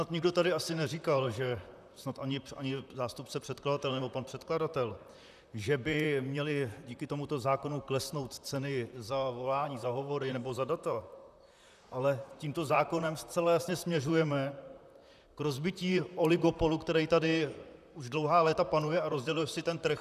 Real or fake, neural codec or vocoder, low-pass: fake; vocoder, 44.1 kHz, 128 mel bands, Pupu-Vocoder; 14.4 kHz